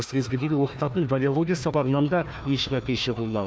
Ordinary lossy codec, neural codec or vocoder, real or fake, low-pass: none; codec, 16 kHz, 1 kbps, FunCodec, trained on Chinese and English, 50 frames a second; fake; none